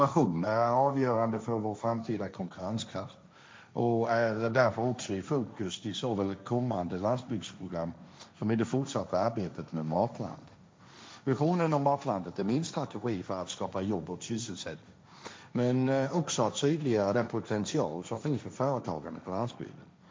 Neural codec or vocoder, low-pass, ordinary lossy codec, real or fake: codec, 16 kHz, 1.1 kbps, Voila-Tokenizer; none; none; fake